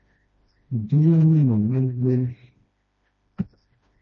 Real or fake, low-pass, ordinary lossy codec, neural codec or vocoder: fake; 7.2 kHz; MP3, 32 kbps; codec, 16 kHz, 1 kbps, FreqCodec, smaller model